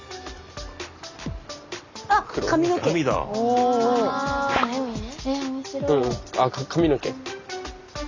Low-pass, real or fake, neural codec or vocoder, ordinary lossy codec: 7.2 kHz; real; none; Opus, 64 kbps